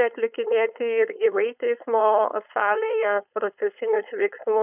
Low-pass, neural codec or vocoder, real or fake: 3.6 kHz; codec, 16 kHz, 4.8 kbps, FACodec; fake